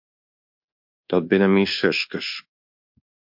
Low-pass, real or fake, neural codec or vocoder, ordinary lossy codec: 5.4 kHz; fake; codec, 24 kHz, 1.2 kbps, DualCodec; MP3, 48 kbps